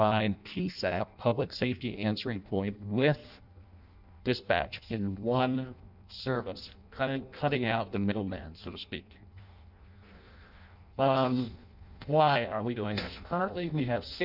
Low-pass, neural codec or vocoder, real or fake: 5.4 kHz; codec, 16 kHz in and 24 kHz out, 0.6 kbps, FireRedTTS-2 codec; fake